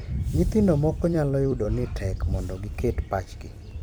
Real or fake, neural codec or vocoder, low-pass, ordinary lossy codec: fake; vocoder, 44.1 kHz, 128 mel bands every 256 samples, BigVGAN v2; none; none